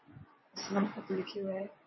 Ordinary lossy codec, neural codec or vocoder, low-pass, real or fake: MP3, 24 kbps; vocoder, 24 kHz, 100 mel bands, Vocos; 7.2 kHz; fake